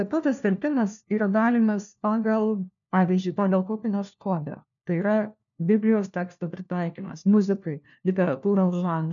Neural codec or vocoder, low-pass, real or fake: codec, 16 kHz, 1 kbps, FunCodec, trained on LibriTTS, 50 frames a second; 7.2 kHz; fake